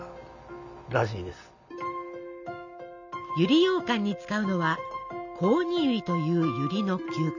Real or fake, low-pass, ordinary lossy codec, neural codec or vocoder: real; 7.2 kHz; none; none